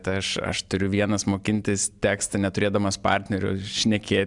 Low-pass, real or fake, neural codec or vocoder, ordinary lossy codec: 10.8 kHz; real; none; MP3, 96 kbps